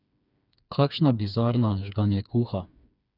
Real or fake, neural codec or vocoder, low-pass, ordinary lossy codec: fake; codec, 16 kHz, 4 kbps, FreqCodec, smaller model; 5.4 kHz; none